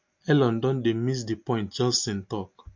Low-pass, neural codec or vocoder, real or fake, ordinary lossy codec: 7.2 kHz; none; real; MP3, 48 kbps